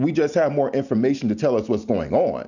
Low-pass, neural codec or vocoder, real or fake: 7.2 kHz; none; real